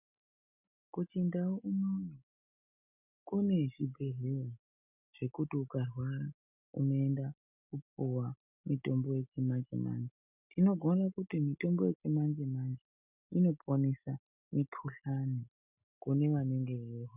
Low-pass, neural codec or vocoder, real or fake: 3.6 kHz; none; real